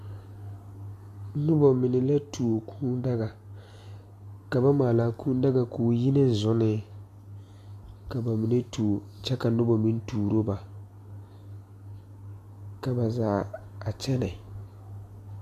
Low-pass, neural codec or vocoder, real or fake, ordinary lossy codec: 14.4 kHz; none; real; MP3, 64 kbps